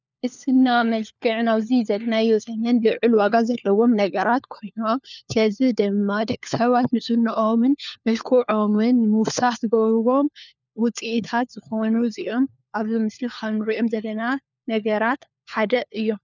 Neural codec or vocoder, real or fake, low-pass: codec, 16 kHz, 4 kbps, FunCodec, trained on LibriTTS, 50 frames a second; fake; 7.2 kHz